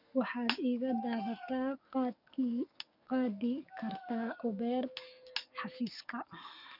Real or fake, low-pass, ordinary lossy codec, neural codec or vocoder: fake; 5.4 kHz; none; codec, 44.1 kHz, 7.8 kbps, DAC